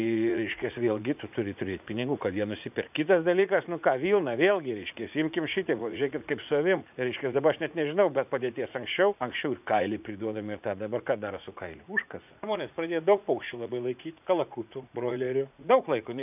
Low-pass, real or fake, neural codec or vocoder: 3.6 kHz; fake; vocoder, 44.1 kHz, 80 mel bands, Vocos